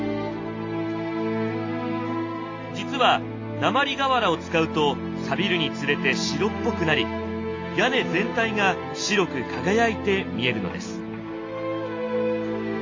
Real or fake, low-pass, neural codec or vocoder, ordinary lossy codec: real; 7.2 kHz; none; AAC, 48 kbps